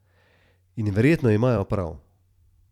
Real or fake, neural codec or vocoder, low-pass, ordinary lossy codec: fake; vocoder, 44.1 kHz, 128 mel bands every 256 samples, BigVGAN v2; 19.8 kHz; none